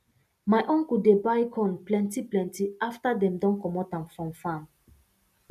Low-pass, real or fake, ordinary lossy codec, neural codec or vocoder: 14.4 kHz; real; none; none